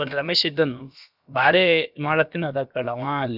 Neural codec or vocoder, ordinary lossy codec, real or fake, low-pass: codec, 16 kHz, about 1 kbps, DyCAST, with the encoder's durations; none; fake; 5.4 kHz